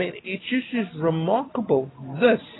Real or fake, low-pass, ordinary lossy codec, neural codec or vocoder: real; 7.2 kHz; AAC, 16 kbps; none